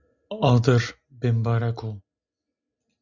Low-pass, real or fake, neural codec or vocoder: 7.2 kHz; real; none